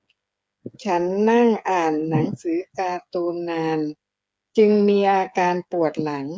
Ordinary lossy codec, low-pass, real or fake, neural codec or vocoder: none; none; fake; codec, 16 kHz, 8 kbps, FreqCodec, smaller model